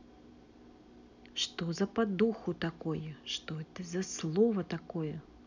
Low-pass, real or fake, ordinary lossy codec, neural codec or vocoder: 7.2 kHz; real; none; none